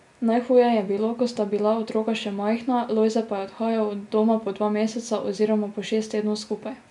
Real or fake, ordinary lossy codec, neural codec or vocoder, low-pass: real; none; none; 10.8 kHz